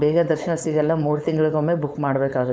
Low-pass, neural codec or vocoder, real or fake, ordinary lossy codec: none; codec, 16 kHz, 4.8 kbps, FACodec; fake; none